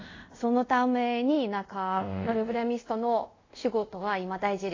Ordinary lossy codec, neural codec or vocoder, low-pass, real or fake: AAC, 32 kbps; codec, 24 kHz, 0.5 kbps, DualCodec; 7.2 kHz; fake